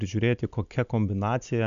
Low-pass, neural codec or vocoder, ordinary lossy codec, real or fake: 7.2 kHz; none; MP3, 96 kbps; real